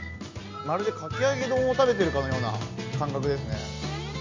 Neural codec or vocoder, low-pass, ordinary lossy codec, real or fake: none; 7.2 kHz; MP3, 64 kbps; real